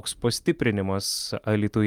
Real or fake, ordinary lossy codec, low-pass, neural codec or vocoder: real; Opus, 32 kbps; 19.8 kHz; none